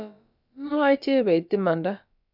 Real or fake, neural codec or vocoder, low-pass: fake; codec, 16 kHz, about 1 kbps, DyCAST, with the encoder's durations; 5.4 kHz